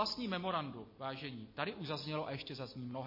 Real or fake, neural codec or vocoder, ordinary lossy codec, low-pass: real; none; MP3, 24 kbps; 5.4 kHz